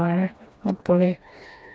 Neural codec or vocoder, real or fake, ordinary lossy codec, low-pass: codec, 16 kHz, 2 kbps, FreqCodec, smaller model; fake; none; none